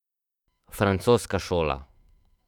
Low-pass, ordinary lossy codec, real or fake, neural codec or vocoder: 19.8 kHz; none; fake; autoencoder, 48 kHz, 128 numbers a frame, DAC-VAE, trained on Japanese speech